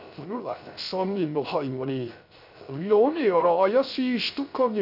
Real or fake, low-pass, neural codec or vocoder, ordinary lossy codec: fake; 5.4 kHz; codec, 16 kHz, 0.3 kbps, FocalCodec; none